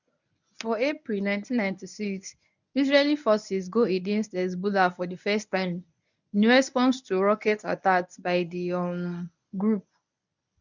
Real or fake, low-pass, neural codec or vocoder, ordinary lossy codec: fake; 7.2 kHz; codec, 24 kHz, 0.9 kbps, WavTokenizer, medium speech release version 1; none